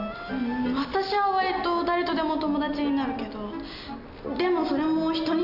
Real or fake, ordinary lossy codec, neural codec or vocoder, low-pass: real; none; none; 5.4 kHz